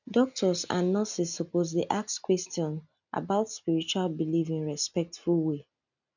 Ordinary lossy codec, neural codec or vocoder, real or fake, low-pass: none; none; real; 7.2 kHz